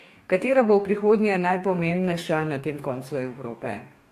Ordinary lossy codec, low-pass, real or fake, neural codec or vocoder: AAC, 64 kbps; 14.4 kHz; fake; codec, 44.1 kHz, 2.6 kbps, DAC